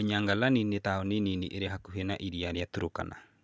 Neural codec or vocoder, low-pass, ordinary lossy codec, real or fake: none; none; none; real